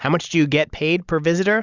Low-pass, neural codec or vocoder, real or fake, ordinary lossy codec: 7.2 kHz; none; real; Opus, 64 kbps